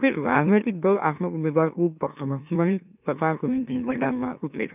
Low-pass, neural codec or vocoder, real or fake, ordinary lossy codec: 3.6 kHz; autoencoder, 44.1 kHz, a latent of 192 numbers a frame, MeloTTS; fake; none